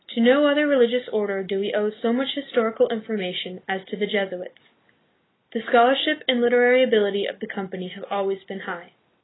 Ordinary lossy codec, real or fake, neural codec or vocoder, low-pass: AAC, 16 kbps; real; none; 7.2 kHz